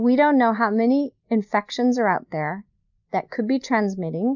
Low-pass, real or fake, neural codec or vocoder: 7.2 kHz; real; none